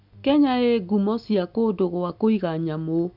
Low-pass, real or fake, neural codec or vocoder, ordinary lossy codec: 5.4 kHz; real; none; AAC, 48 kbps